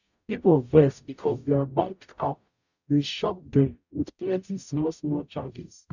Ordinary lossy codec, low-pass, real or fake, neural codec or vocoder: none; 7.2 kHz; fake; codec, 44.1 kHz, 0.9 kbps, DAC